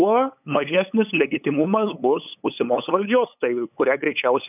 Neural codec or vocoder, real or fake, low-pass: codec, 16 kHz, 8 kbps, FunCodec, trained on LibriTTS, 25 frames a second; fake; 3.6 kHz